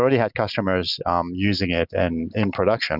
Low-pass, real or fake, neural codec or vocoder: 5.4 kHz; real; none